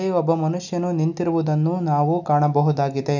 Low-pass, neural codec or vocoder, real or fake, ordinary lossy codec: 7.2 kHz; none; real; none